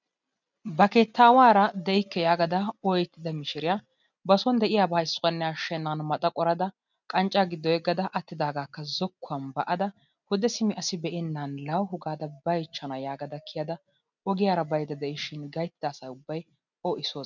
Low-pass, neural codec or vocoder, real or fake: 7.2 kHz; none; real